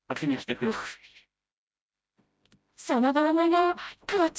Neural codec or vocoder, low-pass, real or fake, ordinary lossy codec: codec, 16 kHz, 0.5 kbps, FreqCodec, smaller model; none; fake; none